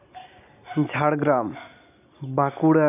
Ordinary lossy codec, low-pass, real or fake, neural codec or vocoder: none; 3.6 kHz; real; none